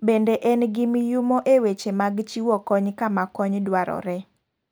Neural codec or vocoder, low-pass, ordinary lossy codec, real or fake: none; none; none; real